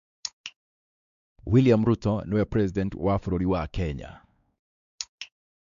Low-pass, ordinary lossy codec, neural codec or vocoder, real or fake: 7.2 kHz; none; codec, 16 kHz, 4 kbps, X-Codec, WavLM features, trained on Multilingual LibriSpeech; fake